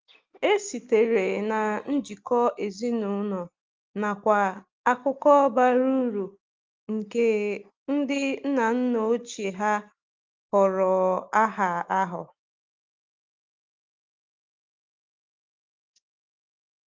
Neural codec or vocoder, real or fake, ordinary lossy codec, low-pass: none; real; Opus, 24 kbps; 7.2 kHz